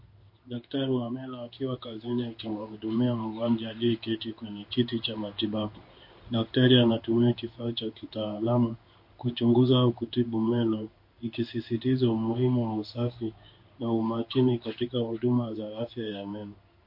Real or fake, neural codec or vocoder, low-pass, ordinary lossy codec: fake; codec, 16 kHz in and 24 kHz out, 1 kbps, XY-Tokenizer; 5.4 kHz; MP3, 32 kbps